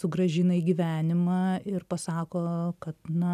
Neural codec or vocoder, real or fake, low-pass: none; real; 14.4 kHz